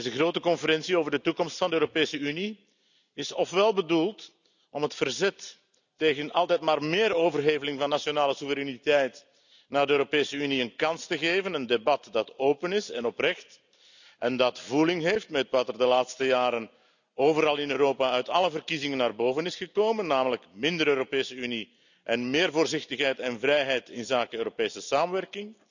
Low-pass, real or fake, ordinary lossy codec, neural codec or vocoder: 7.2 kHz; real; none; none